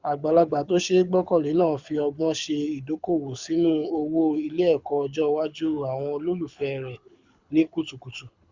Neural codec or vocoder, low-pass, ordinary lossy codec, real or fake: codec, 24 kHz, 6 kbps, HILCodec; 7.2 kHz; Opus, 64 kbps; fake